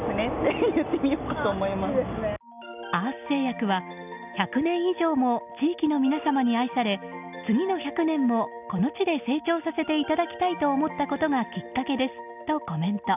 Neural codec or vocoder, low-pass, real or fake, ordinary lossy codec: none; 3.6 kHz; real; none